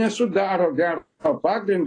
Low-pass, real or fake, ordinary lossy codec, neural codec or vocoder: 9.9 kHz; real; AAC, 32 kbps; none